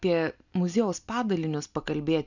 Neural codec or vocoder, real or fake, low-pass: none; real; 7.2 kHz